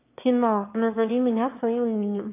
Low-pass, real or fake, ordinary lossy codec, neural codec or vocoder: 3.6 kHz; fake; AAC, 24 kbps; autoencoder, 22.05 kHz, a latent of 192 numbers a frame, VITS, trained on one speaker